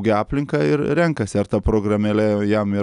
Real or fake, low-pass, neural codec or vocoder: real; 10.8 kHz; none